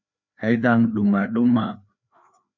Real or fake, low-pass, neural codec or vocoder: fake; 7.2 kHz; codec, 16 kHz, 2 kbps, FreqCodec, larger model